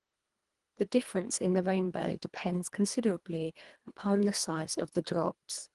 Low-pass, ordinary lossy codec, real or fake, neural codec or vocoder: 10.8 kHz; Opus, 24 kbps; fake; codec, 24 kHz, 1.5 kbps, HILCodec